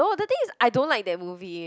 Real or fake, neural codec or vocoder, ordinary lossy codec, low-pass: real; none; none; none